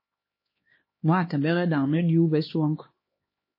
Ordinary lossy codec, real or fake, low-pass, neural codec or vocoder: MP3, 24 kbps; fake; 5.4 kHz; codec, 16 kHz, 2 kbps, X-Codec, HuBERT features, trained on LibriSpeech